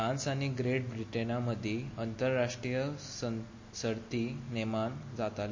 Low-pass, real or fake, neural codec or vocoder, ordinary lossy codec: 7.2 kHz; real; none; MP3, 32 kbps